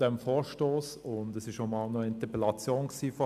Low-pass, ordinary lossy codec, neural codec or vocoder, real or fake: 14.4 kHz; AAC, 96 kbps; none; real